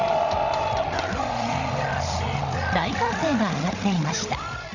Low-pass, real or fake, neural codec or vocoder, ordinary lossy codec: 7.2 kHz; fake; codec, 16 kHz, 16 kbps, FreqCodec, larger model; none